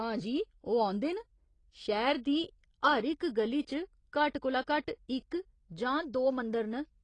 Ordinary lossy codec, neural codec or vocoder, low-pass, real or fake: AAC, 32 kbps; none; 9.9 kHz; real